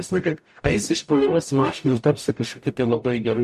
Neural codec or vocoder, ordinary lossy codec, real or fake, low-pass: codec, 44.1 kHz, 0.9 kbps, DAC; MP3, 64 kbps; fake; 14.4 kHz